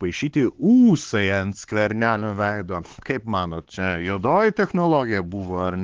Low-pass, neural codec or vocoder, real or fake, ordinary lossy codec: 7.2 kHz; codec, 16 kHz, 4 kbps, X-Codec, HuBERT features, trained on LibriSpeech; fake; Opus, 16 kbps